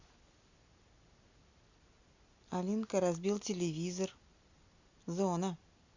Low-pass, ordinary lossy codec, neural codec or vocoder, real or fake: 7.2 kHz; Opus, 64 kbps; none; real